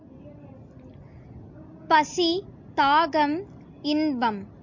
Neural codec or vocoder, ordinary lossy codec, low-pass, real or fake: none; MP3, 48 kbps; 7.2 kHz; real